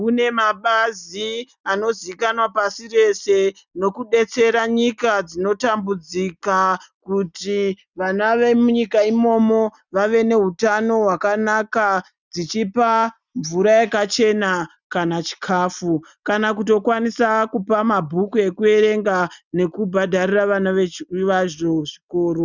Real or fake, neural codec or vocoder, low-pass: real; none; 7.2 kHz